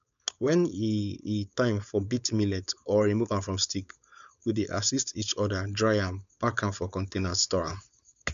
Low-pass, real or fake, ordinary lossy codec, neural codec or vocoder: 7.2 kHz; fake; none; codec, 16 kHz, 4.8 kbps, FACodec